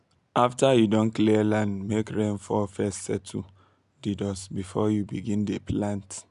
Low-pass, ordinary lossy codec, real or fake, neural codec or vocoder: 10.8 kHz; none; real; none